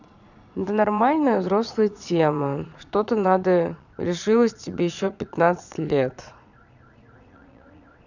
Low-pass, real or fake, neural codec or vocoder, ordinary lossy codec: 7.2 kHz; fake; vocoder, 22.05 kHz, 80 mel bands, Vocos; none